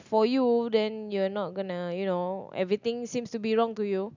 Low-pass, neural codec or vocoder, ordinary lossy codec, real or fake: 7.2 kHz; none; none; real